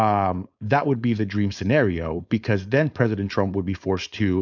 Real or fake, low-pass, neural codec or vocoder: fake; 7.2 kHz; codec, 16 kHz, 4.8 kbps, FACodec